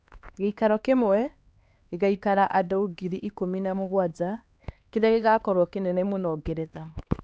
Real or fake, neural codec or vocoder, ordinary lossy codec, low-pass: fake; codec, 16 kHz, 2 kbps, X-Codec, HuBERT features, trained on LibriSpeech; none; none